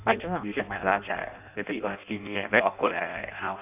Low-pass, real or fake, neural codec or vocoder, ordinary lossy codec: 3.6 kHz; fake; codec, 16 kHz in and 24 kHz out, 0.6 kbps, FireRedTTS-2 codec; none